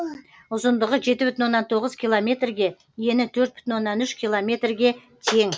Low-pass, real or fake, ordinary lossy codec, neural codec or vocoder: none; real; none; none